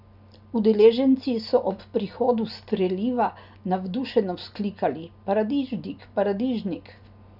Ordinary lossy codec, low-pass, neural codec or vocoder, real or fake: none; 5.4 kHz; none; real